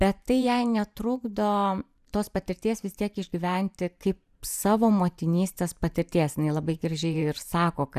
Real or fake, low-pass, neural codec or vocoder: fake; 14.4 kHz; vocoder, 44.1 kHz, 128 mel bands every 512 samples, BigVGAN v2